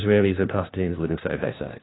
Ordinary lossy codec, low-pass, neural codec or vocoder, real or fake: AAC, 16 kbps; 7.2 kHz; codec, 16 kHz, 1 kbps, FunCodec, trained on LibriTTS, 50 frames a second; fake